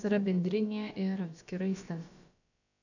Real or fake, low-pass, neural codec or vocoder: fake; 7.2 kHz; codec, 16 kHz, about 1 kbps, DyCAST, with the encoder's durations